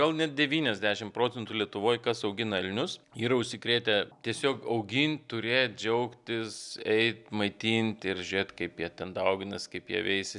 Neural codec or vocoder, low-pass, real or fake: none; 10.8 kHz; real